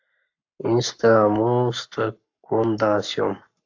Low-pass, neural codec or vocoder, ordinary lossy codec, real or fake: 7.2 kHz; codec, 44.1 kHz, 7.8 kbps, Pupu-Codec; AAC, 48 kbps; fake